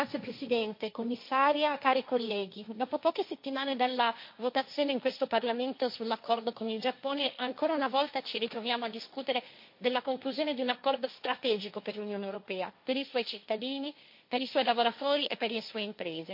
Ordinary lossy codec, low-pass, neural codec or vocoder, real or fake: MP3, 32 kbps; 5.4 kHz; codec, 16 kHz, 1.1 kbps, Voila-Tokenizer; fake